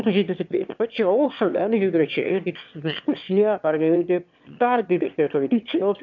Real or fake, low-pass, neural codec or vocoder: fake; 7.2 kHz; autoencoder, 22.05 kHz, a latent of 192 numbers a frame, VITS, trained on one speaker